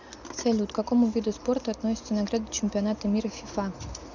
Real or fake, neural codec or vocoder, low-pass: real; none; 7.2 kHz